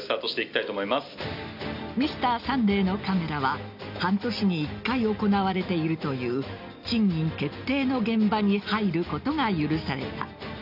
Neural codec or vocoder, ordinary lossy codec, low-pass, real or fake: none; none; 5.4 kHz; real